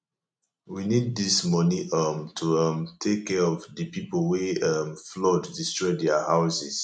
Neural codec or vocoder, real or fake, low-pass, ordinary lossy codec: none; real; none; none